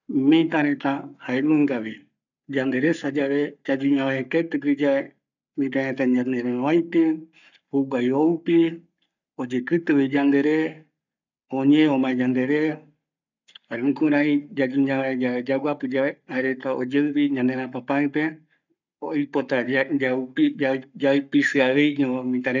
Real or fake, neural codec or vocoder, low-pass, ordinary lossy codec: fake; codec, 44.1 kHz, 7.8 kbps, Pupu-Codec; 7.2 kHz; none